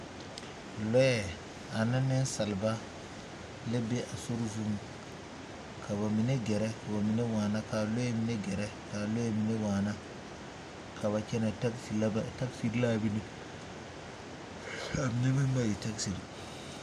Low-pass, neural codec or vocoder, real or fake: 14.4 kHz; none; real